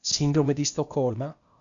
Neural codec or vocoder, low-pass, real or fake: codec, 16 kHz, 0.8 kbps, ZipCodec; 7.2 kHz; fake